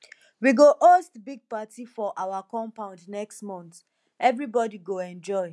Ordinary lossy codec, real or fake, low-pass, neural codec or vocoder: none; real; none; none